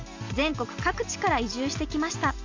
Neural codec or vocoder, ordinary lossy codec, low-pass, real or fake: none; AAC, 48 kbps; 7.2 kHz; real